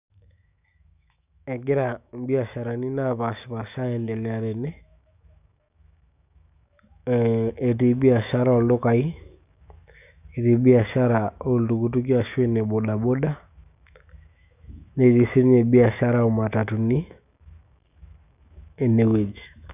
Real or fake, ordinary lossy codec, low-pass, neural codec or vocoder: fake; none; 3.6 kHz; autoencoder, 48 kHz, 128 numbers a frame, DAC-VAE, trained on Japanese speech